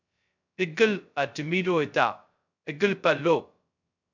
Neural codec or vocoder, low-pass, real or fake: codec, 16 kHz, 0.2 kbps, FocalCodec; 7.2 kHz; fake